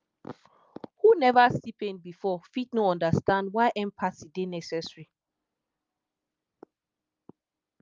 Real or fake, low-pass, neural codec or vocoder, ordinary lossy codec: real; 7.2 kHz; none; Opus, 24 kbps